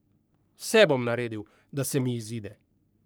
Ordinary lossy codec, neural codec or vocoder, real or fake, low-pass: none; codec, 44.1 kHz, 3.4 kbps, Pupu-Codec; fake; none